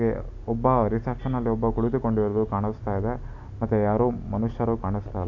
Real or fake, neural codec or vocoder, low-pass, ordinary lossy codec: real; none; 7.2 kHz; none